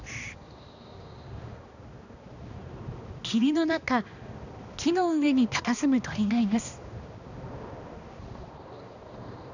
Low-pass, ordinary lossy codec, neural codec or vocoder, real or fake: 7.2 kHz; none; codec, 16 kHz, 2 kbps, X-Codec, HuBERT features, trained on balanced general audio; fake